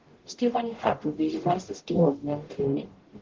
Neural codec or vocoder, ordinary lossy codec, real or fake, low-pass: codec, 44.1 kHz, 0.9 kbps, DAC; Opus, 16 kbps; fake; 7.2 kHz